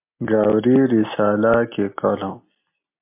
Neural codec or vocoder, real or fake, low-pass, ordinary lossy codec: none; real; 3.6 kHz; MP3, 24 kbps